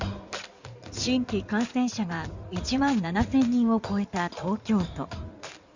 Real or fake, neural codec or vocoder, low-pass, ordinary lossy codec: fake; codec, 16 kHz in and 24 kHz out, 2.2 kbps, FireRedTTS-2 codec; 7.2 kHz; Opus, 64 kbps